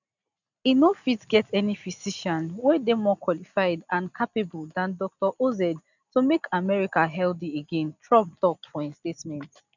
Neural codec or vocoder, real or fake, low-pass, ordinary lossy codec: none; real; 7.2 kHz; none